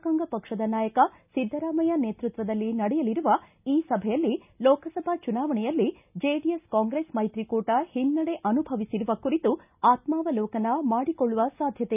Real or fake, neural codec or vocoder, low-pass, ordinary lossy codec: real; none; 3.6 kHz; none